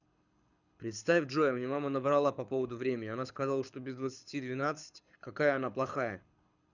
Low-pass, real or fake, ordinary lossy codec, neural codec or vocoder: 7.2 kHz; fake; none; codec, 24 kHz, 6 kbps, HILCodec